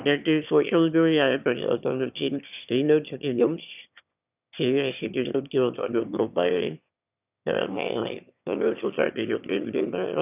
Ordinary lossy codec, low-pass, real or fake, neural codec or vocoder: none; 3.6 kHz; fake; autoencoder, 22.05 kHz, a latent of 192 numbers a frame, VITS, trained on one speaker